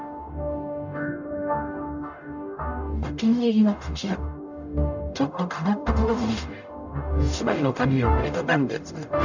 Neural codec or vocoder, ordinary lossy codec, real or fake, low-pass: codec, 44.1 kHz, 0.9 kbps, DAC; none; fake; 7.2 kHz